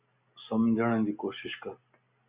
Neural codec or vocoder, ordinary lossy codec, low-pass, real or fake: none; Opus, 64 kbps; 3.6 kHz; real